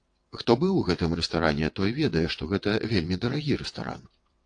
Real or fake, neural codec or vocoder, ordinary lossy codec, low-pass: fake; vocoder, 22.05 kHz, 80 mel bands, WaveNeXt; AAC, 48 kbps; 9.9 kHz